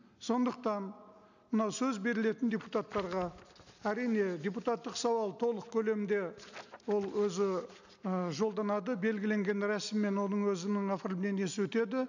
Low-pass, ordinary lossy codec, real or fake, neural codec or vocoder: 7.2 kHz; none; real; none